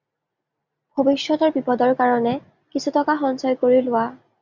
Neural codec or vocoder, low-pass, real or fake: none; 7.2 kHz; real